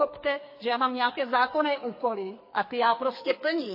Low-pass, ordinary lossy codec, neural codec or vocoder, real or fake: 5.4 kHz; MP3, 24 kbps; codec, 44.1 kHz, 2.6 kbps, SNAC; fake